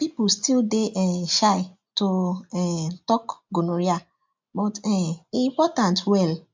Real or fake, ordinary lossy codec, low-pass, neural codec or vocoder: real; MP3, 64 kbps; 7.2 kHz; none